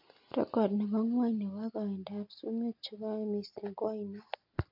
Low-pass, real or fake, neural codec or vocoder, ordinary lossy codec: 5.4 kHz; real; none; MP3, 48 kbps